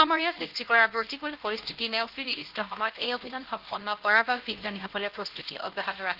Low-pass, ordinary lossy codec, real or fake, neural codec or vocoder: 5.4 kHz; Opus, 16 kbps; fake; codec, 16 kHz, 1 kbps, X-Codec, HuBERT features, trained on LibriSpeech